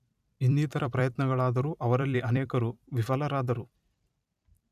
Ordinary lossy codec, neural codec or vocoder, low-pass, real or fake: none; vocoder, 44.1 kHz, 128 mel bands every 256 samples, BigVGAN v2; 14.4 kHz; fake